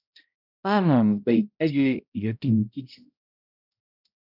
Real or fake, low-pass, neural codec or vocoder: fake; 5.4 kHz; codec, 16 kHz, 0.5 kbps, X-Codec, HuBERT features, trained on balanced general audio